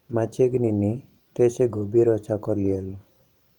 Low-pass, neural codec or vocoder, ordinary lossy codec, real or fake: 19.8 kHz; vocoder, 44.1 kHz, 128 mel bands every 512 samples, BigVGAN v2; Opus, 32 kbps; fake